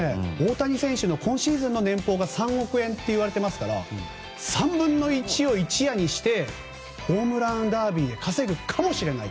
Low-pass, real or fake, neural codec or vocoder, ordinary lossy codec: none; real; none; none